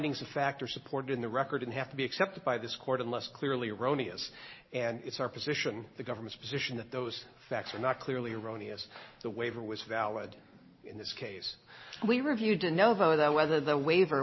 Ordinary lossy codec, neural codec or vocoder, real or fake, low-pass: MP3, 24 kbps; none; real; 7.2 kHz